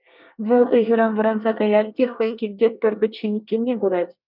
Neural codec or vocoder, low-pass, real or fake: codec, 24 kHz, 1 kbps, SNAC; 5.4 kHz; fake